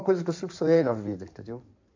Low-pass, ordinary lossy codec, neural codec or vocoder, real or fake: 7.2 kHz; AAC, 48 kbps; codec, 16 kHz in and 24 kHz out, 2.2 kbps, FireRedTTS-2 codec; fake